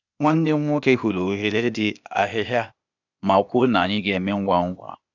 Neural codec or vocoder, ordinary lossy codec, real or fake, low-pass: codec, 16 kHz, 0.8 kbps, ZipCodec; none; fake; 7.2 kHz